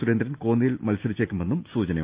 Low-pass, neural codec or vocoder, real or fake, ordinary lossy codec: 3.6 kHz; none; real; Opus, 24 kbps